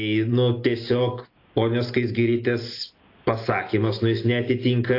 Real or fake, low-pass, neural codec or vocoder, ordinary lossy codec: real; 5.4 kHz; none; AAC, 32 kbps